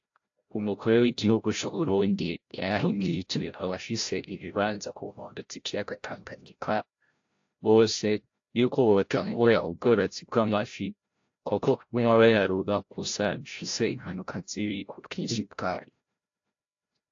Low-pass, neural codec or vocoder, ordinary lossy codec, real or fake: 7.2 kHz; codec, 16 kHz, 0.5 kbps, FreqCodec, larger model; AAC, 48 kbps; fake